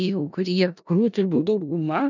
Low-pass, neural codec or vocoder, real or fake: 7.2 kHz; codec, 16 kHz in and 24 kHz out, 0.4 kbps, LongCat-Audio-Codec, four codebook decoder; fake